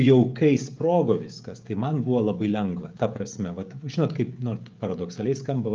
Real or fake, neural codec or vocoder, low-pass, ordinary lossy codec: fake; codec, 16 kHz, 16 kbps, FreqCodec, smaller model; 7.2 kHz; Opus, 24 kbps